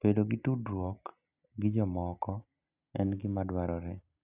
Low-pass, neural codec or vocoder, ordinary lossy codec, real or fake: 3.6 kHz; none; none; real